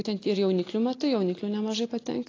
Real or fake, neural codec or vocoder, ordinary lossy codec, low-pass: real; none; AAC, 32 kbps; 7.2 kHz